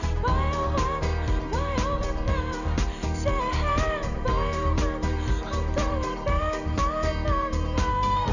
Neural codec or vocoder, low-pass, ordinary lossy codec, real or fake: vocoder, 44.1 kHz, 128 mel bands every 512 samples, BigVGAN v2; 7.2 kHz; none; fake